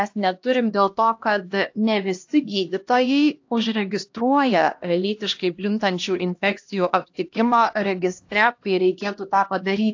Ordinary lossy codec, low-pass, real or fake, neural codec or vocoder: AAC, 48 kbps; 7.2 kHz; fake; codec, 16 kHz, 1 kbps, X-Codec, HuBERT features, trained on LibriSpeech